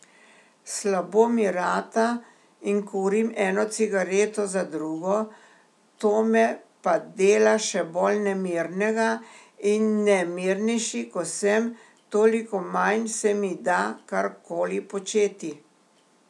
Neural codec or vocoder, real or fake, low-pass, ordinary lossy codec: none; real; none; none